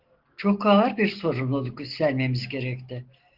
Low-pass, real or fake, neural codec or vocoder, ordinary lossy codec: 5.4 kHz; real; none; Opus, 16 kbps